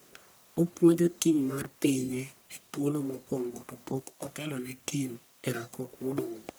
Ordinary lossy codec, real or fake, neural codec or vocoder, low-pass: none; fake; codec, 44.1 kHz, 1.7 kbps, Pupu-Codec; none